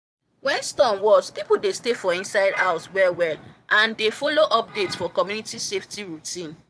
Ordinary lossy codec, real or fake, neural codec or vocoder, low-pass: none; fake; vocoder, 22.05 kHz, 80 mel bands, Vocos; none